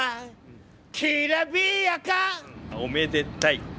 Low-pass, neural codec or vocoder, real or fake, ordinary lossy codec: none; none; real; none